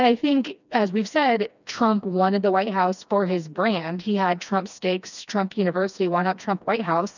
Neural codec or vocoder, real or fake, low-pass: codec, 16 kHz, 2 kbps, FreqCodec, smaller model; fake; 7.2 kHz